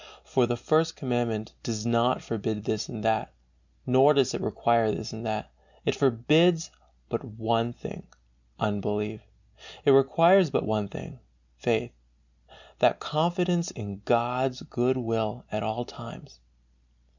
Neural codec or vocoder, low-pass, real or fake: none; 7.2 kHz; real